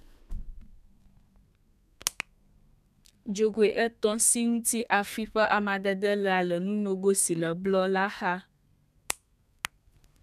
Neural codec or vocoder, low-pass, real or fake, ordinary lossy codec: codec, 32 kHz, 1.9 kbps, SNAC; 14.4 kHz; fake; none